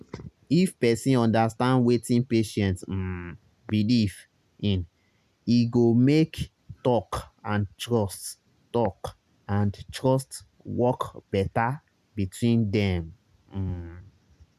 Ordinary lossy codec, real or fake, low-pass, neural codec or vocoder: none; real; 14.4 kHz; none